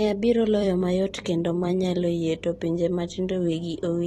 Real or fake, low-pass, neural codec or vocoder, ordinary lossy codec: fake; 19.8 kHz; vocoder, 44.1 kHz, 128 mel bands every 256 samples, BigVGAN v2; AAC, 32 kbps